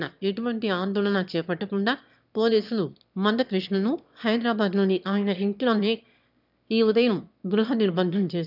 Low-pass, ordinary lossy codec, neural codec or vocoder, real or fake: 5.4 kHz; none; autoencoder, 22.05 kHz, a latent of 192 numbers a frame, VITS, trained on one speaker; fake